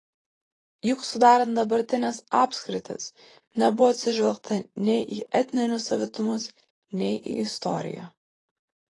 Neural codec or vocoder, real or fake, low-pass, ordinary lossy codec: vocoder, 44.1 kHz, 128 mel bands, Pupu-Vocoder; fake; 10.8 kHz; AAC, 32 kbps